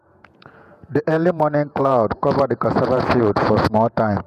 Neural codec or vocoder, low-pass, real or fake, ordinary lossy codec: vocoder, 48 kHz, 128 mel bands, Vocos; 14.4 kHz; fake; none